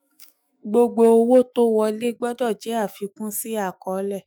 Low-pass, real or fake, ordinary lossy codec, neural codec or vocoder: none; fake; none; autoencoder, 48 kHz, 128 numbers a frame, DAC-VAE, trained on Japanese speech